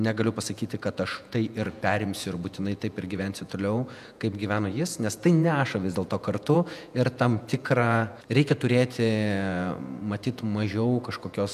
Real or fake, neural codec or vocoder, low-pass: fake; vocoder, 48 kHz, 128 mel bands, Vocos; 14.4 kHz